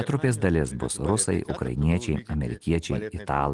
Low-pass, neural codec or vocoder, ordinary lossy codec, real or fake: 10.8 kHz; none; Opus, 24 kbps; real